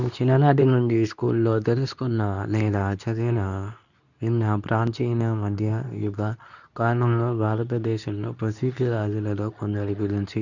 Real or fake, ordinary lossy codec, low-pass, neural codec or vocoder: fake; none; 7.2 kHz; codec, 24 kHz, 0.9 kbps, WavTokenizer, medium speech release version 2